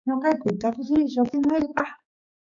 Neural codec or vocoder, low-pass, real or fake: codec, 16 kHz, 4 kbps, X-Codec, HuBERT features, trained on balanced general audio; 7.2 kHz; fake